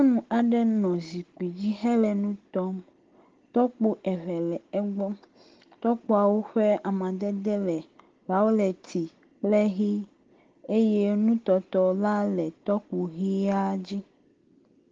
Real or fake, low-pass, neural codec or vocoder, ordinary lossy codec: real; 7.2 kHz; none; Opus, 16 kbps